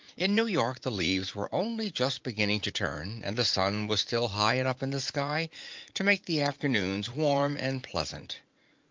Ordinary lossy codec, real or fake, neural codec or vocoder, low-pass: Opus, 24 kbps; fake; vocoder, 44.1 kHz, 80 mel bands, Vocos; 7.2 kHz